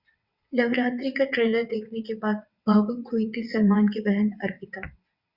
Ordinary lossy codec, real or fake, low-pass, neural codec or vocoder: Opus, 64 kbps; fake; 5.4 kHz; vocoder, 22.05 kHz, 80 mel bands, WaveNeXt